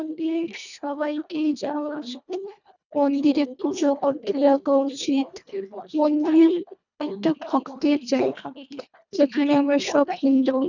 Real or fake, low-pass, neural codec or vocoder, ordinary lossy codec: fake; 7.2 kHz; codec, 24 kHz, 1.5 kbps, HILCodec; none